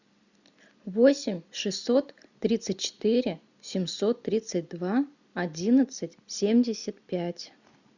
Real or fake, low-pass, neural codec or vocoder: real; 7.2 kHz; none